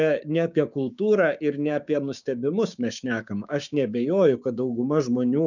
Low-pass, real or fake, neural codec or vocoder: 7.2 kHz; fake; codec, 16 kHz, 6 kbps, DAC